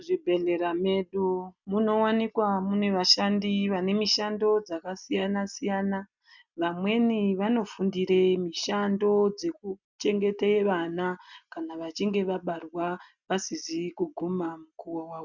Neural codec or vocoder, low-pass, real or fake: none; 7.2 kHz; real